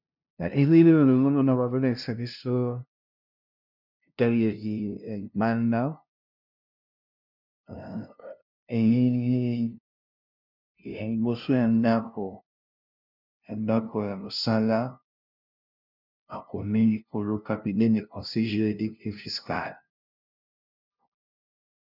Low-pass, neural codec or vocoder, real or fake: 5.4 kHz; codec, 16 kHz, 0.5 kbps, FunCodec, trained on LibriTTS, 25 frames a second; fake